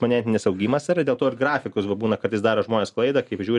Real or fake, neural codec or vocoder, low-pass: real; none; 10.8 kHz